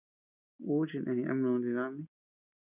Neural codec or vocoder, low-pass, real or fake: none; 3.6 kHz; real